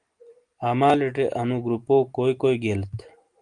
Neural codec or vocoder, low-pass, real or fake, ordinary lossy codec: none; 9.9 kHz; real; Opus, 24 kbps